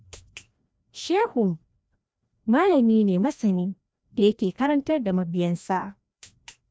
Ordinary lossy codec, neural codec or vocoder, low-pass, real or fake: none; codec, 16 kHz, 1 kbps, FreqCodec, larger model; none; fake